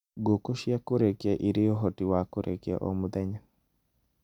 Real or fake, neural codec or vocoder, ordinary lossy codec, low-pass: real; none; none; 19.8 kHz